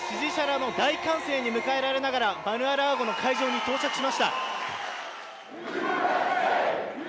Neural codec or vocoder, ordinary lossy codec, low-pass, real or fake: none; none; none; real